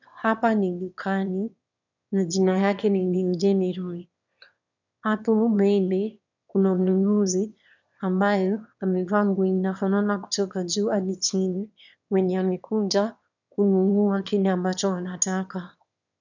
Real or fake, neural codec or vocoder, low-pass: fake; autoencoder, 22.05 kHz, a latent of 192 numbers a frame, VITS, trained on one speaker; 7.2 kHz